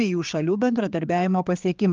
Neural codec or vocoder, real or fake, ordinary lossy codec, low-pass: codec, 16 kHz, 4 kbps, X-Codec, HuBERT features, trained on general audio; fake; Opus, 32 kbps; 7.2 kHz